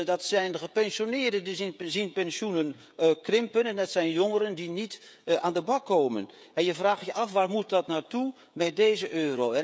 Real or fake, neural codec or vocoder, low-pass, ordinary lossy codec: fake; codec, 16 kHz, 16 kbps, FreqCodec, smaller model; none; none